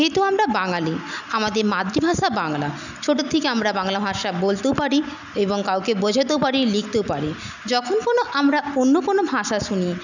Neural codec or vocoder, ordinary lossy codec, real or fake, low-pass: none; none; real; 7.2 kHz